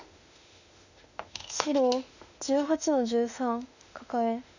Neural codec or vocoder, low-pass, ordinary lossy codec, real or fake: autoencoder, 48 kHz, 32 numbers a frame, DAC-VAE, trained on Japanese speech; 7.2 kHz; none; fake